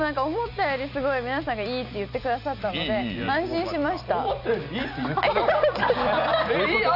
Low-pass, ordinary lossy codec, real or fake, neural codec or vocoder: 5.4 kHz; none; real; none